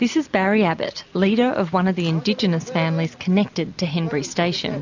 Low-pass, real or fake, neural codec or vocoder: 7.2 kHz; real; none